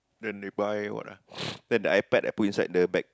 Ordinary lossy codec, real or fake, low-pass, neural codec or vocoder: none; real; none; none